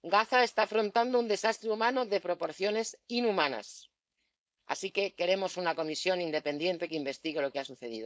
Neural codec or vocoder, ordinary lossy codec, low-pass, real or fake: codec, 16 kHz, 4.8 kbps, FACodec; none; none; fake